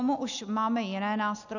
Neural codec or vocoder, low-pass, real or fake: none; 7.2 kHz; real